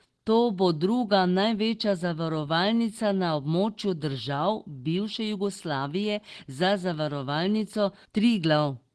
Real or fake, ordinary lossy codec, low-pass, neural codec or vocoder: real; Opus, 16 kbps; 10.8 kHz; none